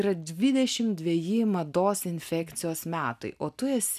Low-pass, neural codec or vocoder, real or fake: 14.4 kHz; none; real